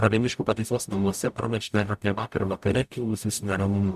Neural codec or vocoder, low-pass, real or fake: codec, 44.1 kHz, 0.9 kbps, DAC; 14.4 kHz; fake